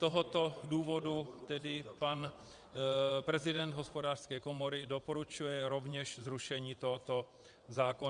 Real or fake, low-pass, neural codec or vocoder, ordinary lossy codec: fake; 9.9 kHz; vocoder, 22.05 kHz, 80 mel bands, WaveNeXt; Opus, 64 kbps